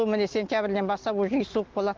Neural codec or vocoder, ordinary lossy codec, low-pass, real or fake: none; Opus, 16 kbps; 7.2 kHz; real